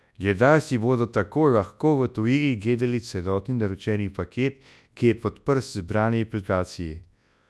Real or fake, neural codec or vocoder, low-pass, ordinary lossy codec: fake; codec, 24 kHz, 0.9 kbps, WavTokenizer, large speech release; none; none